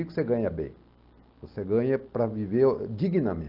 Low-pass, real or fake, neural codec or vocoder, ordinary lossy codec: 5.4 kHz; real; none; Opus, 32 kbps